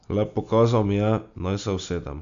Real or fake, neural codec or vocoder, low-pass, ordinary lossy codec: real; none; 7.2 kHz; none